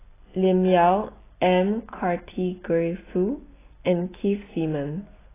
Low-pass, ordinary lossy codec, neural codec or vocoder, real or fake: 3.6 kHz; AAC, 16 kbps; none; real